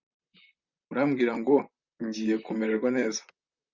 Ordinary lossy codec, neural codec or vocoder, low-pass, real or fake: Opus, 64 kbps; vocoder, 44.1 kHz, 128 mel bands, Pupu-Vocoder; 7.2 kHz; fake